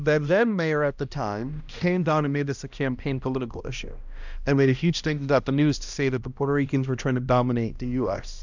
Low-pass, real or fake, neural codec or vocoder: 7.2 kHz; fake; codec, 16 kHz, 1 kbps, X-Codec, HuBERT features, trained on balanced general audio